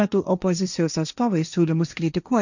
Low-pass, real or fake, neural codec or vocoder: 7.2 kHz; fake; codec, 16 kHz, 1.1 kbps, Voila-Tokenizer